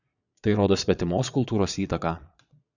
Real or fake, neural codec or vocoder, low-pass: fake; vocoder, 22.05 kHz, 80 mel bands, Vocos; 7.2 kHz